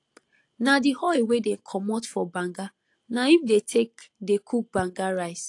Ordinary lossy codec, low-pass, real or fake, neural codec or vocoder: AAC, 48 kbps; 10.8 kHz; real; none